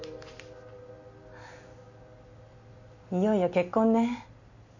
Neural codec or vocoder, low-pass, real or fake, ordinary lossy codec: none; 7.2 kHz; real; none